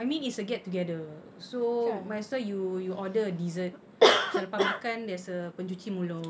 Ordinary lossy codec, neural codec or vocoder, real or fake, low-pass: none; none; real; none